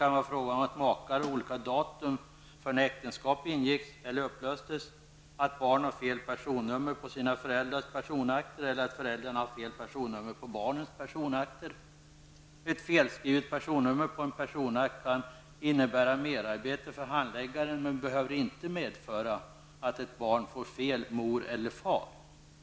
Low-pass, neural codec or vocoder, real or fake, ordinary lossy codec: none; none; real; none